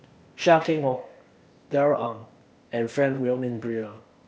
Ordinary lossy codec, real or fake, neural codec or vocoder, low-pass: none; fake; codec, 16 kHz, 0.8 kbps, ZipCodec; none